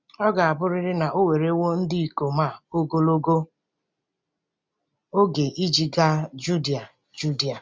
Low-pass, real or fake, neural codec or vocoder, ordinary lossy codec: none; real; none; none